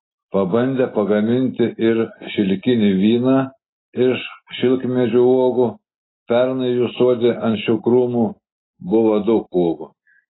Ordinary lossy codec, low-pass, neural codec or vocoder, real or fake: AAC, 16 kbps; 7.2 kHz; none; real